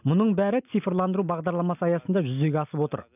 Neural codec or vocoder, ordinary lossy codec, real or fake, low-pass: none; none; real; 3.6 kHz